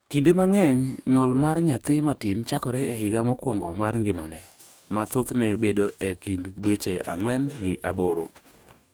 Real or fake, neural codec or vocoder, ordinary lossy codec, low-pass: fake; codec, 44.1 kHz, 2.6 kbps, DAC; none; none